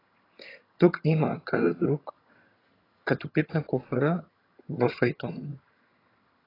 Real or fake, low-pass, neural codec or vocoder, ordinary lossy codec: fake; 5.4 kHz; vocoder, 22.05 kHz, 80 mel bands, HiFi-GAN; AAC, 24 kbps